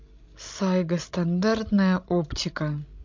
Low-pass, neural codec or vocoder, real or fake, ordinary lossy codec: 7.2 kHz; codec, 16 kHz, 16 kbps, FreqCodec, larger model; fake; MP3, 48 kbps